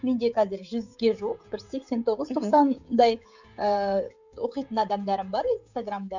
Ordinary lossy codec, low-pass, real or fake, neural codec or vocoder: none; 7.2 kHz; fake; codec, 16 kHz, 16 kbps, FreqCodec, smaller model